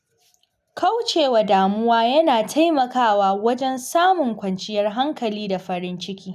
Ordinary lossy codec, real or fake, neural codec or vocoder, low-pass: none; real; none; 14.4 kHz